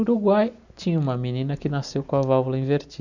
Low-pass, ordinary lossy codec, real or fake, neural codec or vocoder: 7.2 kHz; none; real; none